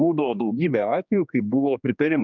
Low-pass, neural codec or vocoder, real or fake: 7.2 kHz; codec, 16 kHz, 2 kbps, X-Codec, HuBERT features, trained on general audio; fake